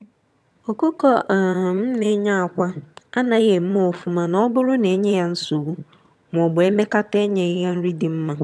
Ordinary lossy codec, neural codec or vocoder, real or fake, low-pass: none; vocoder, 22.05 kHz, 80 mel bands, HiFi-GAN; fake; none